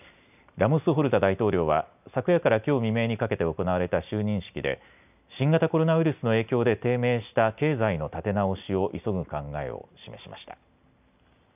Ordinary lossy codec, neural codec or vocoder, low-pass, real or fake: none; none; 3.6 kHz; real